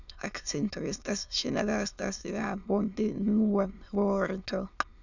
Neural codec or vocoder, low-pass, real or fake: autoencoder, 22.05 kHz, a latent of 192 numbers a frame, VITS, trained on many speakers; 7.2 kHz; fake